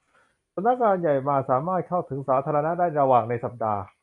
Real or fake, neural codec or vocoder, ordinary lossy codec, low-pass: fake; vocoder, 44.1 kHz, 128 mel bands every 256 samples, BigVGAN v2; MP3, 96 kbps; 10.8 kHz